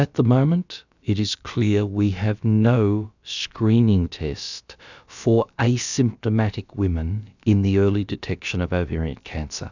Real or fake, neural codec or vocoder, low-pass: fake; codec, 16 kHz, about 1 kbps, DyCAST, with the encoder's durations; 7.2 kHz